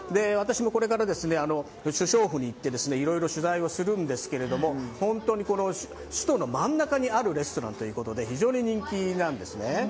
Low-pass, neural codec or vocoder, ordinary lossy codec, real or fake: none; none; none; real